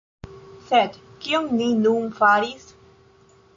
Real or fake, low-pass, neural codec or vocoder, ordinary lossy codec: real; 7.2 kHz; none; AAC, 48 kbps